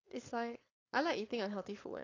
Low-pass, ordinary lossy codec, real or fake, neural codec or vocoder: 7.2 kHz; AAC, 32 kbps; fake; codec, 16 kHz, 4.8 kbps, FACodec